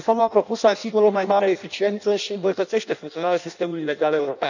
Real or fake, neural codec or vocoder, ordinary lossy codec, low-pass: fake; codec, 16 kHz in and 24 kHz out, 0.6 kbps, FireRedTTS-2 codec; none; 7.2 kHz